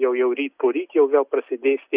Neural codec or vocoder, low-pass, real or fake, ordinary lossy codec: none; 3.6 kHz; real; Opus, 64 kbps